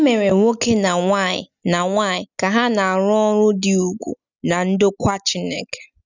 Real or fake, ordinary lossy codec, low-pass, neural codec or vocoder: real; none; 7.2 kHz; none